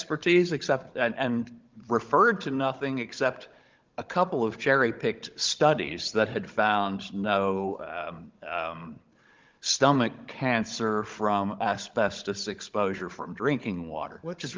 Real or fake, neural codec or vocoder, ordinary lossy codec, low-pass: fake; codec, 16 kHz, 16 kbps, FunCodec, trained on Chinese and English, 50 frames a second; Opus, 32 kbps; 7.2 kHz